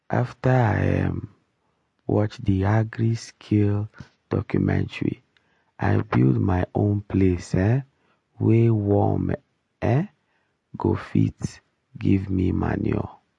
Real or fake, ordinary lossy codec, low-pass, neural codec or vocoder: real; MP3, 48 kbps; 10.8 kHz; none